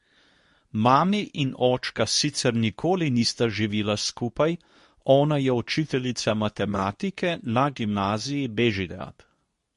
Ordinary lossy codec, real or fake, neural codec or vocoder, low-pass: MP3, 48 kbps; fake; codec, 24 kHz, 0.9 kbps, WavTokenizer, medium speech release version 2; 10.8 kHz